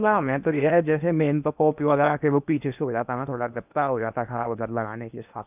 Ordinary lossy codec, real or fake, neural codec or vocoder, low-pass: none; fake; codec, 16 kHz in and 24 kHz out, 0.8 kbps, FocalCodec, streaming, 65536 codes; 3.6 kHz